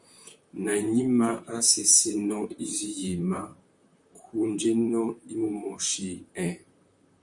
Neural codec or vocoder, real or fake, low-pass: vocoder, 44.1 kHz, 128 mel bands, Pupu-Vocoder; fake; 10.8 kHz